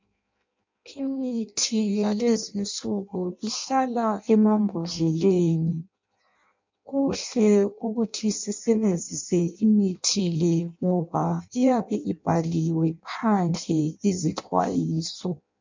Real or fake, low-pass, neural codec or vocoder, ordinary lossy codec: fake; 7.2 kHz; codec, 16 kHz in and 24 kHz out, 0.6 kbps, FireRedTTS-2 codec; MP3, 64 kbps